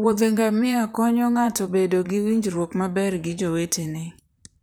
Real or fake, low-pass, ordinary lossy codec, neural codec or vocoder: fake; none; none; vocoder, 44.1 kHz, 128 mel bands, Pupu-Vocoder